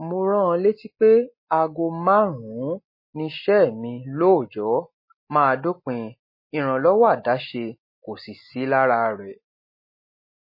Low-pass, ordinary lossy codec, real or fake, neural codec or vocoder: 5.4 kHz; MP3, 24 kbps; real; none